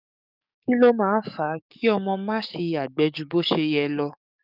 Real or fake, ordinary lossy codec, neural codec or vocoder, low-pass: fake; none; codec, 44.1 kHz, 7.8 kbps, DAC; 5.4 kHz